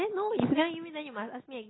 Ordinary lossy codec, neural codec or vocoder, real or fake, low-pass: AAC, 16 kbps; none; real; 7.2 kHz